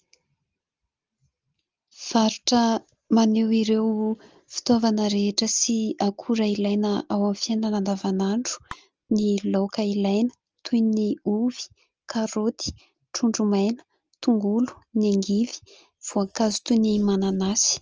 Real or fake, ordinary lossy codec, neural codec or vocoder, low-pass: real; Opus, 24 kbps; none; 7.2 kHz